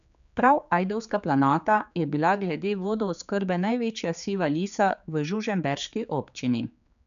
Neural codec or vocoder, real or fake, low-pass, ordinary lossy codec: codec, 16 kHz, 4 kbps, X-Codec, HuBERT features, trained on general audio; fake; 7.2 kHz; none